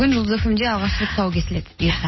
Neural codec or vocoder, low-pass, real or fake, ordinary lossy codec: none; 7.2 kHz; real; MP3, 24 kbps